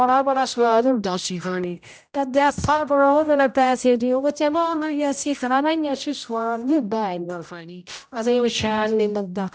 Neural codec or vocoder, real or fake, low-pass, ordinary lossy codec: codec, 16 kHz, 0.5 kbps, X-Codec, HuBERT features, trained on general audio; fake; none; none